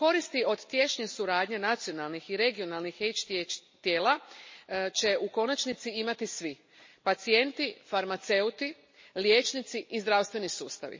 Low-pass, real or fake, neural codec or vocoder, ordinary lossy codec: 7.2 kHz; real; none; MP3, 32 kbps